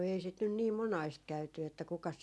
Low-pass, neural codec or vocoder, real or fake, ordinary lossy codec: none; none; real; none